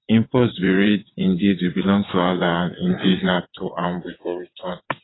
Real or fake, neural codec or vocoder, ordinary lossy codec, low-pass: fake; vocoder, 22.05 kHz, 80 mel bands, WaveNeXt; AAC, 16 kbps; 7.2 kHz